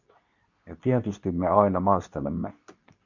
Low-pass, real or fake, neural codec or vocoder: 7.2 kHz; fake; codec, 24 kHz, 0.9 kbps, WavTokenizer, medium speech release version 2